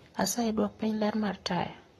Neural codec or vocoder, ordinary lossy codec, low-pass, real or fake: codec, 44.1 kHz, 7.8 kbps, Pupu-Codec; AAC, 32 kbps; 19.8 kHz; fake